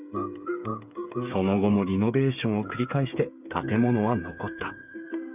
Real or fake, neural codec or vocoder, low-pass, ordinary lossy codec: fake; codec, 16 kHz, 8 kbps, FreqCodec, smaller model; 3.6 kHz; none